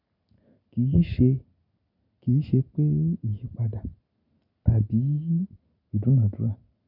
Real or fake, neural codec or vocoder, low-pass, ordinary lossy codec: real; none; 5.4 kHz; none